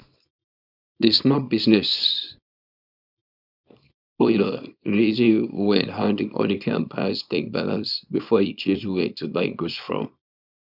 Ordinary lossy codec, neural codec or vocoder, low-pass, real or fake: none; codec, 24 kHz, 0.9 kbps, WavTokenizer, small release; 5.4 kHz; fake